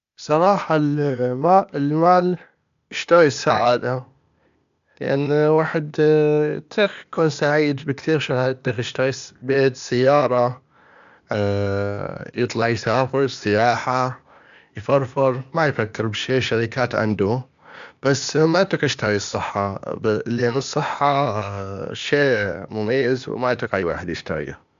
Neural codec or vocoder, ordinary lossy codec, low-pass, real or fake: codec, 16 kHz, 0.8 kbps, ZipCodec; MP3, 64 kbps; 7.2 kHz; fake